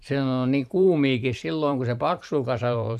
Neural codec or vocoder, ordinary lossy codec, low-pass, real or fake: vocoder, 44.1 kHz, 128 mel bands every 512 samples, BigVGAN v2; none; 14.4 kHz; fake